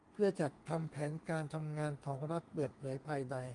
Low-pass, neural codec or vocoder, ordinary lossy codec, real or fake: 10.8 kHz; codec, 32 kHz, 1.9 kbps, SNAC; Opus, 32 kbps; fake